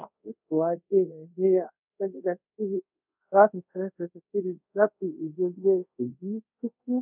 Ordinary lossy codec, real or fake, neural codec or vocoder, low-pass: none; fake; codec, 24 kHz, 0.5 kbps, DualCodec; 3.6 kHz